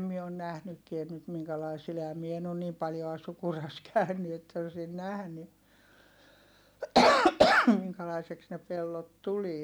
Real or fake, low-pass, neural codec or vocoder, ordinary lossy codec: real; none; none; none